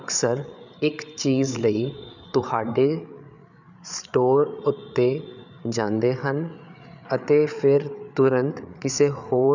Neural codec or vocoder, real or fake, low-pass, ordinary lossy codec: codec, 16 kHz, 8 kbps, FreqCodec, larger model; fake; 7.2 kHz; none